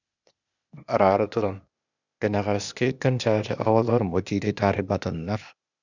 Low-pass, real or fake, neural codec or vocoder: 7.2 kHz; fake; codec, 16 kHz, 0.8 kbps, ZipCodec